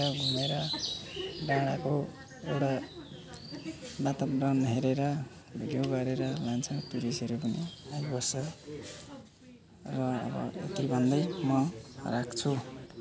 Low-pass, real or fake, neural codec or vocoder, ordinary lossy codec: none; real; none; none